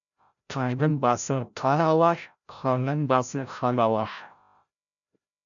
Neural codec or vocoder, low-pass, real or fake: codec, 16 kHz, 0.5 kbps, FreqCodec, larger model; 7.2 kHz; fake